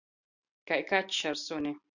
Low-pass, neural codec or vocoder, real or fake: 7.2 kHz; none; real